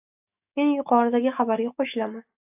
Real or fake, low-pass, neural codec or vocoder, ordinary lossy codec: fake; 3.6 kHz; autoencoder, 48 kHz, 128 numbers a frame, DAC-VAE, trained on Japanese speech; AAC, 32 kbps